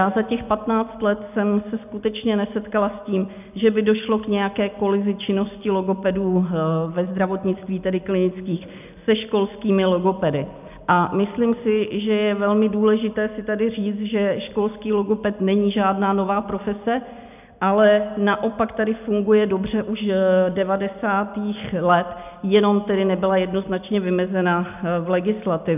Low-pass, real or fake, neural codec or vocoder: 3.6 kHz; real; none